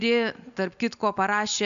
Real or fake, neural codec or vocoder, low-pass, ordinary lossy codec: real; none; 7.2 kHz; MP3, 96 kbps